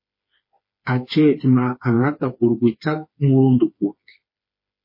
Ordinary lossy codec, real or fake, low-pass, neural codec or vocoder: MP3, 24 kbps; fake; 5.4 kHz; codec, 16 kHz, 4 kbps, FreqCodec, smaller model